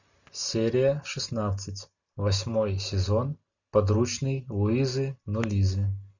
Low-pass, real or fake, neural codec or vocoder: 7.2 kHz; real; none